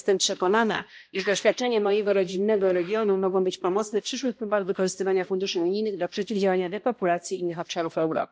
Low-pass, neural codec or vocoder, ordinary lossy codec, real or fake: none; codec, 16 kHz, 1 kbps, X-Codec, HuBERT features, trained on balanced general audio; none; fake